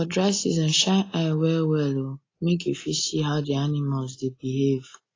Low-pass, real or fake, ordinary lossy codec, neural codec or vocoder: 7.2 kHz; real; AAC, 32 kbps; none